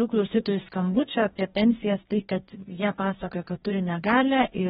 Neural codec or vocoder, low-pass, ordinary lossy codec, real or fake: codec, 44.1 kHz, 2.6 kbps, DAC; 19.8 kHz; AAC, 16 kbps; fake